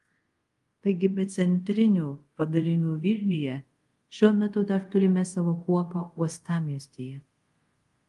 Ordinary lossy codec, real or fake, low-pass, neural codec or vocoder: Opus, 24 kbps; fake; 10.8 kHz; codec, 24 kHz, 0.5 kbps, DualCodec